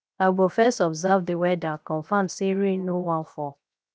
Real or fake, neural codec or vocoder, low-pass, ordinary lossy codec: fake; codec, 16 kHz, 0.7 kbps, FocalCodec; none; none